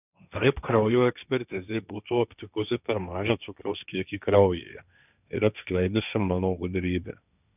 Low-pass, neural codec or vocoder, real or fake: 3.6 kHz; codec, 16 kHz, 1.1 kbps, Voila-Tokenizer; fake